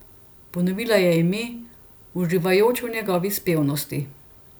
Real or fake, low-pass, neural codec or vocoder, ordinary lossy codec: real; none; none; none